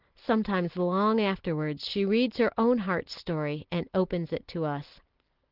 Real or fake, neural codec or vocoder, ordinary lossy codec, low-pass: real; none; Opus, 16 kbps; 5.4 kHz